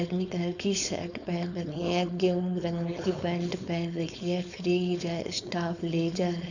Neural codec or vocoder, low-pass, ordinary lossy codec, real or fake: codec, 16 kHz, 4.8 kbps, FACodec; 7.2 kHz; none; fake